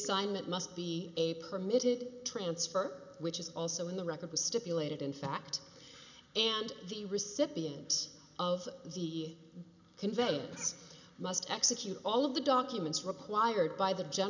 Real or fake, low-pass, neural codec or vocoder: real; 7.2 kHz; none